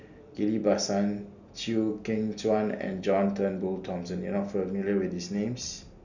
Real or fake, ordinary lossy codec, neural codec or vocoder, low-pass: real; none; none; 7.2 kHz